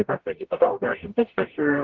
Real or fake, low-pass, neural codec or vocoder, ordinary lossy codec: fake; 7.2 kHz; codec, 44.1 kHz, 0.9 kbps, DAC; Opus, 16 kbps